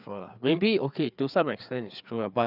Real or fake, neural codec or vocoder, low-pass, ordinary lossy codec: fake; codec, 16 kHz, 4 kbps, FreqCodec, larger model; 5.4 kHz; none